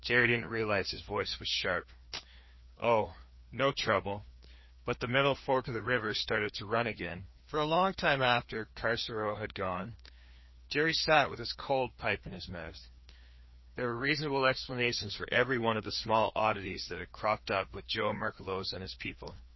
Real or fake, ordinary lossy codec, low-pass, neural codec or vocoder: fake; MP3, 24 kbps; 7.2 kHz; codec, 16 kHz, 2 kbps, FreqCodec, larger model